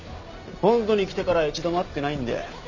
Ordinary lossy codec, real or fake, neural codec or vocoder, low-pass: none; real; none; 7.2 kHz